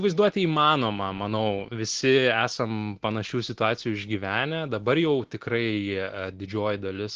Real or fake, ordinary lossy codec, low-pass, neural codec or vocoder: real; Opus, 16 kbps; 7.2 kHz; none